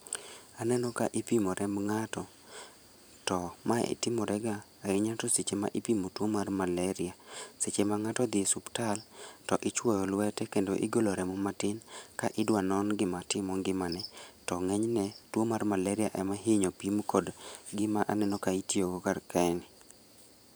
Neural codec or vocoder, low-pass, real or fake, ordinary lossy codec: vocoder, 44.1 kHz, 128 mel bands every 512 samples, BigVGAN v2; none; fake; none